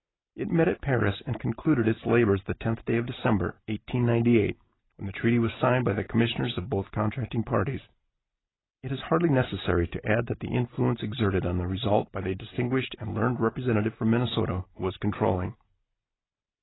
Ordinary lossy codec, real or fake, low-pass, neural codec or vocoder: AAC, 16 kbps; real; 7.2 kHz; none